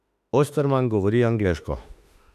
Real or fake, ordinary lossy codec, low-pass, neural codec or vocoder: fake; none; 14.4 kHz; autoencoder, 48 kHz, 32 numbers a frame, DAC-VAE, trained on Japanese speech